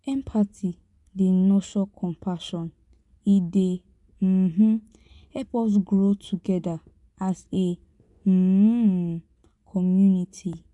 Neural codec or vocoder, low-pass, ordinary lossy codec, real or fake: vocoder, 24 kHz, 100 mel bands, Vocos; 10.8 kHz; none; fake